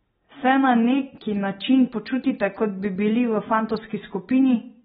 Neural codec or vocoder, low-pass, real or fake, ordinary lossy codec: none; 19.8 kHz; real; AAC, 16 kbps